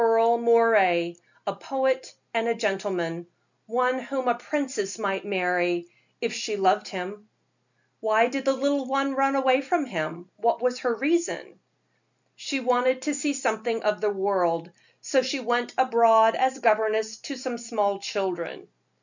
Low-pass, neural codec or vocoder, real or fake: 7.2 kHz; none; real